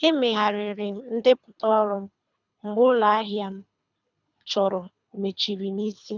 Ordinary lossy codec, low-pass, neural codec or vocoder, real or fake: none; 7.2 kHz; codec, 24 kHz, 3 kbps, HILCodec; fake